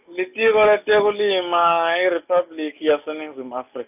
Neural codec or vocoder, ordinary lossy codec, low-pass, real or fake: none; none; 3.6 kHz; real